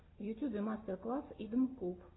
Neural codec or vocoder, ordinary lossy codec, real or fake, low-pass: none; AAC, 16 kbps; real; 7.2 kHz